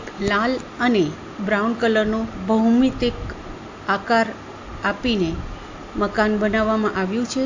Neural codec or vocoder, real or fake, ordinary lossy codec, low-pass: none; real; AAC, 48 kbps; 7.2 kHz